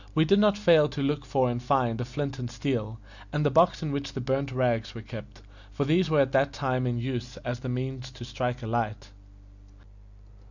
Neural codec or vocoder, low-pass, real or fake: none; 7.2 kHz; real